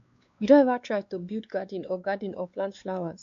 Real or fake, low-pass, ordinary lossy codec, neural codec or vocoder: fake; 7.2 kHz; MP3, 64 kbps; codec, 16 kHz, 2 kbps, X-Codec, WavLM features, trained on Multilingual LibriSpeech